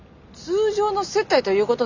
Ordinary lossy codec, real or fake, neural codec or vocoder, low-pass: none; real; none; 7.2 kHz